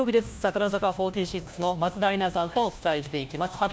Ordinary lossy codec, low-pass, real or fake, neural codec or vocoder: none; none; fake; codec, 16 kHz, 1 kbps, FunCodec, trained on LibriTTS, 50 frames a second